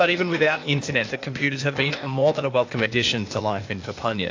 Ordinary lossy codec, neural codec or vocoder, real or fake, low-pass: AAC, 48 kbps; codec, 16 kHz, 0.8 kbps, ZipCodec; fake; 7.2 kHz